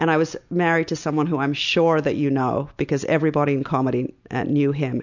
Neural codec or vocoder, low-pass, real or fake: none; 7.2 kHz; real